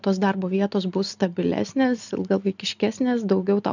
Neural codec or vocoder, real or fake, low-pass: none; real; 7.2 kHz